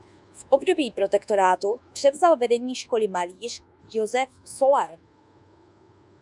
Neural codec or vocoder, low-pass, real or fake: codec, 24 kHz, 1.2 kbps, DualCodec; 10.8 kHz; fake